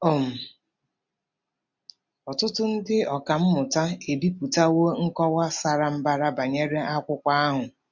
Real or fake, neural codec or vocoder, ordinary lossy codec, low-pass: real; none; MP3, 64 kbps; 7.2 kHz